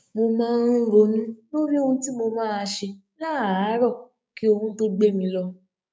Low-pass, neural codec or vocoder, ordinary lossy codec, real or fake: none; codec, 16 kHz, 6 kbps, DAC; none; fake